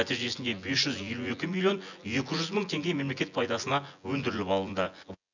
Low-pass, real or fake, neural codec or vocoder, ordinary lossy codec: 7.2 kHz; fake; vocoder, 24 kHz, 100 mel bands, Vocos; none